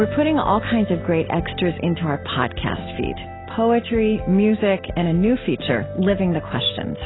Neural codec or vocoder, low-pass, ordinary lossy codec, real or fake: none; 7.2 kHz; AAC, 16 kbps; real